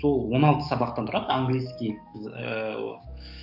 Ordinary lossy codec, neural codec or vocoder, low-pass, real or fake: none; codec, 44.1 kHz, 7.8 kbps, DAC; 5.4 kHz; fake